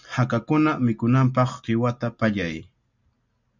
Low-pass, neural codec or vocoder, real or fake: 7.2 kHz; none; real